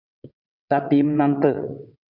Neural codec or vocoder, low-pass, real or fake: vocoder, 22.05 kHz, 80 mel bands, WaveNeXt; 5.4 kHz; fake